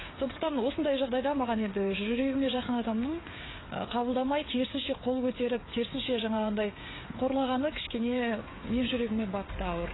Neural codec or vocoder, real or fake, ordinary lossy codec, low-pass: codec, 16 kHz, 16 kbps, FreqCodec, smaller model; fake; AAC, 16 kbps; 7.2 kHz